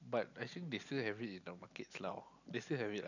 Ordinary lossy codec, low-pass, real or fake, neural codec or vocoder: none; 7.2 kHz; real; none